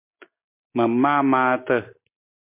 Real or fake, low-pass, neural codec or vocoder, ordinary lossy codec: real; 3.6 kHz; none; MP3, 24 kbps